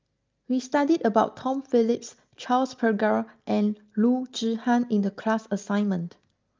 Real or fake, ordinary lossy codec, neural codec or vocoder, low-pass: real; Opus, 24 kbps; none; 7.2 kHz